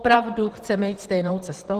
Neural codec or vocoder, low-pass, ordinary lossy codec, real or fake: vocoder, 44.1 kHz, 128 mel bands, Pupu-Vocoder; 14.4 kHz; Opus, 16 kbps; fake